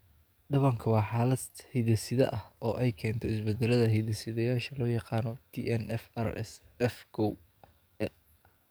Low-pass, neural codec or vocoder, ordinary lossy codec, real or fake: none; codec, 44.1 kHz, 7.8 kbps, DAC; none; fake